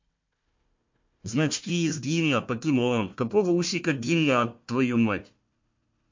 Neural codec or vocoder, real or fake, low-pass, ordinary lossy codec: codec, 16 kHz, 1 kbps, FunCodec, trained on Chinese and English, 50 frames a second; fake; 7.2 kHz; MP3, 48 kbps